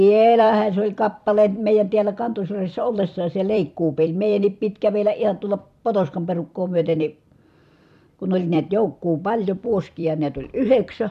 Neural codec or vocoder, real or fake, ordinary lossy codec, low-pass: vocoder, 44.1 kHz, 128 mel bands every 256 samples, BigVGAN v2; fake; none; 14.4 kHz